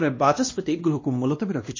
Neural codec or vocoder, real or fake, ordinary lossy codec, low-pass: codec, 16 kHz, 1 kbps, X-Codec, WavLM features, trained on Multilingual LibriSpeech; fake; MP3, 32 kbps; 7.2 kHz